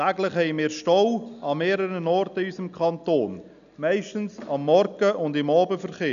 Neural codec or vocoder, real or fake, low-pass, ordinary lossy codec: none; real; 7.2 kHz; none